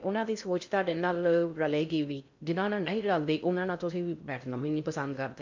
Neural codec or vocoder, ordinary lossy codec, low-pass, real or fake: codec, 16 kHz in and 24 kHz out, 0.6 kbps, FocalCodec, streaming, 2048 codes; MP3, 64 kbps; 7.2 kHz; fake